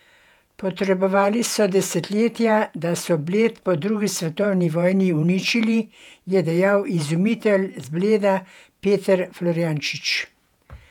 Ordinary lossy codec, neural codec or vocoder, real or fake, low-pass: none; vocoder, 48 kHz, 128 mel bands, Vocos; fake; 19.8 kHz